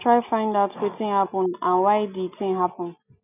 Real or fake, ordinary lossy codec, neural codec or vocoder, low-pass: real; none; none; 3.6 kHz